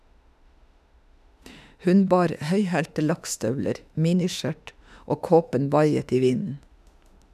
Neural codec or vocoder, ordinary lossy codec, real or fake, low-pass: autoencoder, 48 kHz, 32 numbers a frame, DAC-VAE, trained on Japanese speech; none; fake; 14.4 kHz